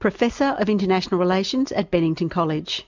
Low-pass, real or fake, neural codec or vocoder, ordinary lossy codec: 7.2 kHz; real; none; MP3, 64 kbps